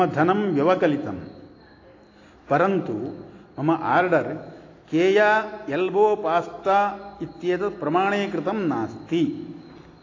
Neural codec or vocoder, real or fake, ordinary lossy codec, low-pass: none; real; AAC, 32 kbps; 7.2 kHz